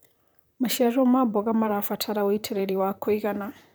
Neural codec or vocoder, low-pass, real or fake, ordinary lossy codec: vocoder, 44.1 kHz, 128 mel bands, Pupu-Vocoder; none; fake; none